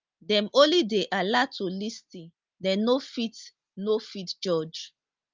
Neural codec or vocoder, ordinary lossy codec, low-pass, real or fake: none; Opus, 24 kbps; 7.2 kHz; real